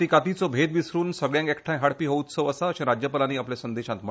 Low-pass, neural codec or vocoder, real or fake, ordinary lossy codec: none; none; real; none